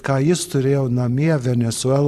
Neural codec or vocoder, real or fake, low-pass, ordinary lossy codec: vocoder, 44.1 kHz, 128 mel bands every 512 samples, BigVGAN v2; fake; 14.4 kHz; AAC, 96 kbps